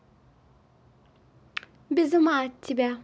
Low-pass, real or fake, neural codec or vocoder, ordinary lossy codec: none; real; none; none